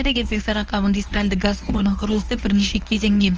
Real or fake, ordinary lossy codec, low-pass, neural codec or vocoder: fake; Opus, 24 kbps; 7.2 kHz; codec, 24 kHz, 0.9 kbps, WavTokenizer, medium speech release version 1